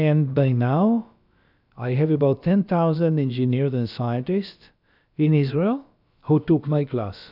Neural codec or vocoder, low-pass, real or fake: codec, 16 kHz, about 1 kbps, DyCAST, with the encoder's durations; 5.4 kHz; fake